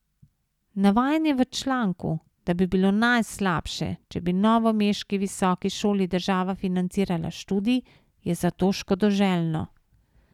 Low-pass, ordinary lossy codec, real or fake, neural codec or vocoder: 19.8 kHz; none; real; none